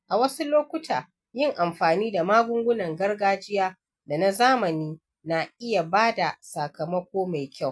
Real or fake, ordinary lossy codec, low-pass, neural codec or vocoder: real; none; none; none